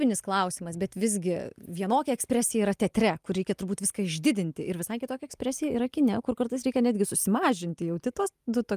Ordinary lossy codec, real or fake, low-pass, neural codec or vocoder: Opus, 32 kbps; real; 14.4 kHz; none